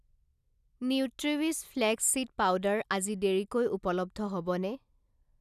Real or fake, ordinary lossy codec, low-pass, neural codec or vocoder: real; none; 14.4 kHz; none